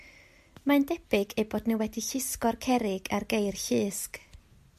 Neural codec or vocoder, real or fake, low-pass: none; real; 14.4 kHz